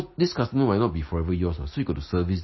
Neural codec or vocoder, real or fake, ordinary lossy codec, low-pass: none; real; MP3, 24 kbps; 7.2 kHz